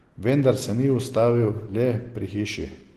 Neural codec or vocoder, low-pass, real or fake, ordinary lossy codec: none; 14.4 kHz; real; Opus, 16 kbps